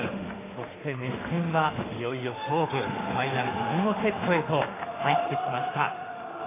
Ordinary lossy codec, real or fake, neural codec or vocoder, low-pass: AAC, 16 kbps; fake; autoencoder, 48 kHz, 32 numbers a frame, DAC-VAE, trained on Japanese speech; 3.6 kHz